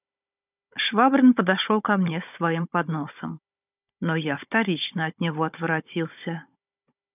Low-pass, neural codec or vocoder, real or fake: 3.6 kHz; codec, 16 kHz, 16 kbps, FunCodec, trained on Chinese and English, 50 frames a second; fake